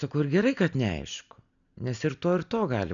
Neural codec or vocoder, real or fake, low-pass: none; real; 7.2 kHz